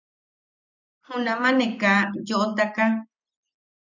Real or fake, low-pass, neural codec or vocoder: real; 7.2 kHz; none